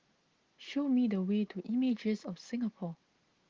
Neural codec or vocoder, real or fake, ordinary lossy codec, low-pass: none; real; Opus, 16 kbps; 7.2 kHz